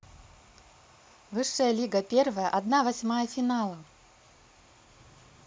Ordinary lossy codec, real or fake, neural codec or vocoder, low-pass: none; real; none; none